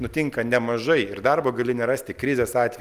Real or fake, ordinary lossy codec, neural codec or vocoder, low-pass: real; Opus, 32 kbps; none; 14.4 kHz